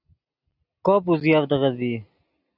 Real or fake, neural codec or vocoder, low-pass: real; none; 5.4 kHz